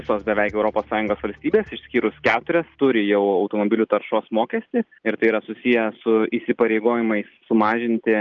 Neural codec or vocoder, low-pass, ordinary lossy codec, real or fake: none; 7.2 kHz; Opus, 24 kbps; real